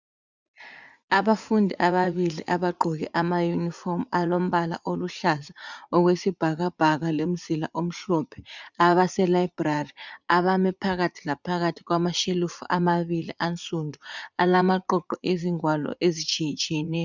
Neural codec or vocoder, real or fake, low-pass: vocoder, 44.1 kHz, 80 mel bands, Vocos; fake; 7.2 kHz